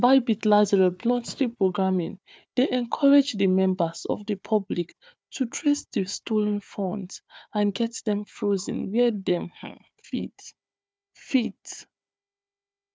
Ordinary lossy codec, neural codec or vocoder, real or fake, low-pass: none; codec, 16 kHz, 4 kbps, FunCodec, trained on Chinese and English, 50 frames a second; fake; none